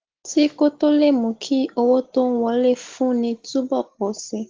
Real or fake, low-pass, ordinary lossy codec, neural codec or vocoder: real; 7.2 kHz; Opus, 16 kbps; none